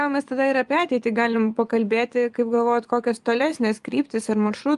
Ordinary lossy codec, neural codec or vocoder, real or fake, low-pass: Opus, 32 kbps; vocoder, 24 kHz, 100 mel bands, Vocos; fake; 10.8 kHz